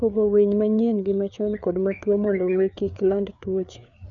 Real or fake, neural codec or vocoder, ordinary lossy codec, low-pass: fake; codec, 16 kHz, 4 kbps, FreqCodec, larger model; none; 7.2 kHz